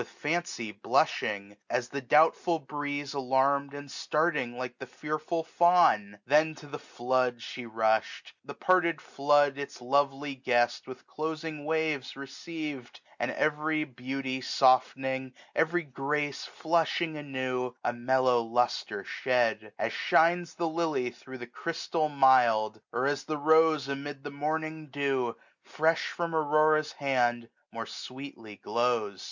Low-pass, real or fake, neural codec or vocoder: 7.2 kHz; real; none